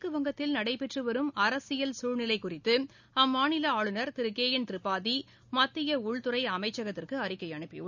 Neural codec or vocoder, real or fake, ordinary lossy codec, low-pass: none; real; none; 7.2 kHz